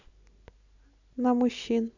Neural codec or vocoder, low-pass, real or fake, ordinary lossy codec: none; 7.2 kHz; real; none